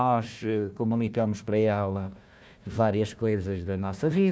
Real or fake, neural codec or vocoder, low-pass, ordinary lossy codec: fake; codec, 16 kHz, 1 kbps, FunCodec, trained on Chinese and English, 50 frames a second; none; none